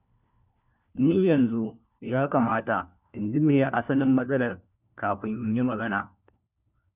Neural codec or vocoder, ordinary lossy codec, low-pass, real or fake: codec, 16 kHz, 1 kbps, FunCodec, trained on LibriTTS, 50 frames a second; none; 3.6 kHz; fake